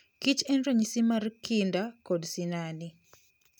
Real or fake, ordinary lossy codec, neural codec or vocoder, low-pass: real; none; none; none